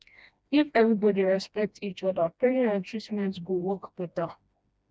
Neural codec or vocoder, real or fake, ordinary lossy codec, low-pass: codec, 16 kHz, 1 kbps, FreqCodec, smaller model; fake; none; none